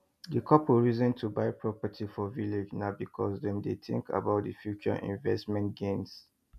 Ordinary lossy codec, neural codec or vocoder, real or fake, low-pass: MP3, 96 kbps; none; real; 14.4 kHz